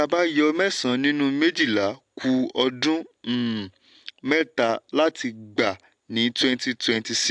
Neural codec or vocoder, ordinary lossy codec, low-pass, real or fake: none; AAC, 64 kbps; 9.9 kHz; real